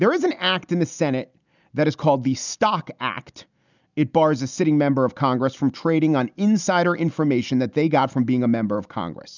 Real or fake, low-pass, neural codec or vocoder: real; 7.2 kHz; none